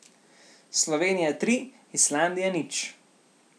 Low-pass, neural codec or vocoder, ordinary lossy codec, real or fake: none; none; none; real